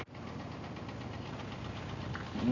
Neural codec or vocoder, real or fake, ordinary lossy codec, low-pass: none; real; none; 7.2 kHz